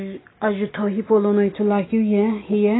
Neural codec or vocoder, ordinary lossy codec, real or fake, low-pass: none; AAC, 16 kbps; real; 7.2 kHz